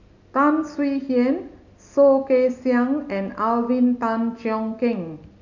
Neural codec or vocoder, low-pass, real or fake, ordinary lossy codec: none; 7.2 kHz; real; none